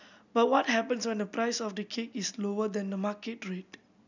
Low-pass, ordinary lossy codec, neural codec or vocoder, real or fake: 7.2 kHz; none; none; real